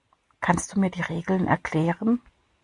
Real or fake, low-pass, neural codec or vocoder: real; 10.8 kHz; none